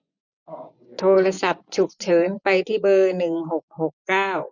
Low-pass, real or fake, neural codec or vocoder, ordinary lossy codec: 7.2 kHz; fake; vocoder, 24 kHz, 100 mel bands, Vocos; none